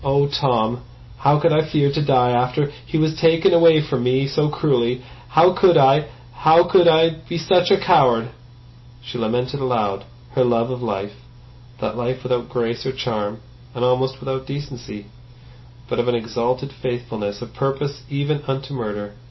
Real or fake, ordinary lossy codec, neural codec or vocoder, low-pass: real; MP3, 24 kbps; none; 7.2 kHz